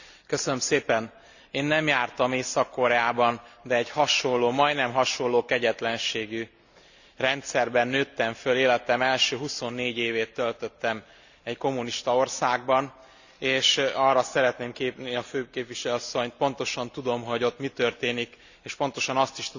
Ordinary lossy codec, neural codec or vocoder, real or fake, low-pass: none; none; real; 7.2 kHz